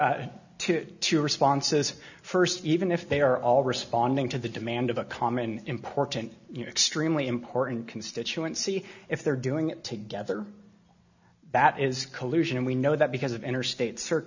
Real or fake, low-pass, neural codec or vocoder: real; 7.2 kHz; none